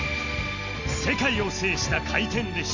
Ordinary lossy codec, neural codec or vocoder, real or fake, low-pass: none; none; real; 7.2 kHz